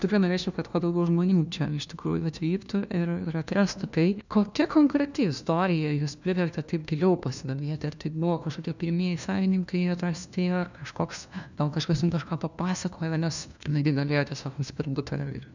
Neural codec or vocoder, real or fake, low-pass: codec, 16 kHz, 1 kbps, FunCodec, trained on LibriTTS, 50 frames a second; fake; 7.2 kHz